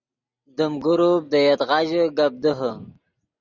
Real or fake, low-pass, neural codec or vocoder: real; 7.2 kHz; none